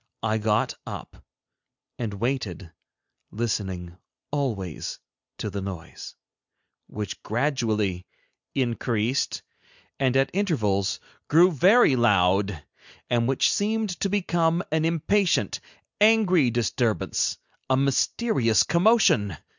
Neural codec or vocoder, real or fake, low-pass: none; real; 7.2 kHz